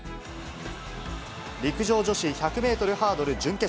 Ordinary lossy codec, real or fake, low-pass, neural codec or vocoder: none; real; none; none